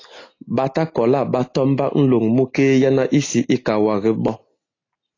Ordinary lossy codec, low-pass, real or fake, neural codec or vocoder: AAC, 32 kbps; 7.2 kHz; real; none